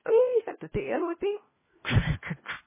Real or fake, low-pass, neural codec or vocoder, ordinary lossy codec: fake; 3.6 kHz; codec, 16 kHz, 1 kbps, FunCodec, trained on LibriTTS, 50 frames a second; MP3, 16 kbps